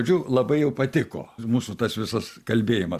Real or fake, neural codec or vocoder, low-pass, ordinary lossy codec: real; none; 14.4 kHz; Opus, 64 kbps